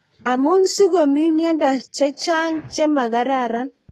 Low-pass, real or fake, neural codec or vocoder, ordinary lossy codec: 14.4 kHz; fake; codec, 32 kHz, 1.9 kbps, SNAC; AAC, 32 kbps